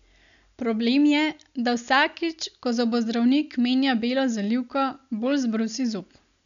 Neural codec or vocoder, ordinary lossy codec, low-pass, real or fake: none; none; 7.2 kHz; real